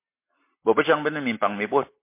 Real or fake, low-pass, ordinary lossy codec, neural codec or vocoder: real; 3.6 kHz; MP3, 24 kbps; none